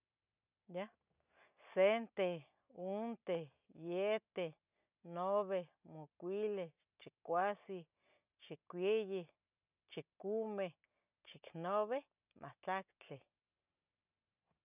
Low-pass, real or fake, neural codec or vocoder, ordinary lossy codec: 3.6 kHz; real; none; none